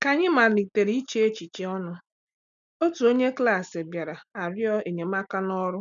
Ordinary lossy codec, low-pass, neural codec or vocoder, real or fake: none; 7.2 kHz; none; real